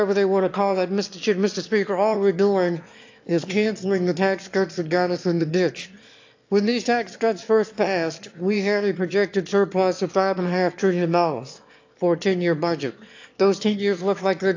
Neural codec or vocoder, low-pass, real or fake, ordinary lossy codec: autoencoder, 22.05 kHz, a latent of 192 numbers a frame, VITS, trained on one speaker; 7.2 kHz; fake; AAC, 48 kbps